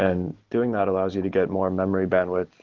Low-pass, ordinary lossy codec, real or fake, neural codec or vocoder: 7.2 kHz; Opus, 16 kbps; real; none